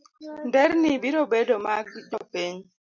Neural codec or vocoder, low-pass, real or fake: none; 7.2 kHz; real